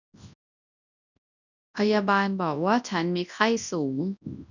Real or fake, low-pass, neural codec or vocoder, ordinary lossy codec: fake; 7.2 kHz; codec, 24 kHz, 0.9 kbps, WavTokenizer, large speech release; none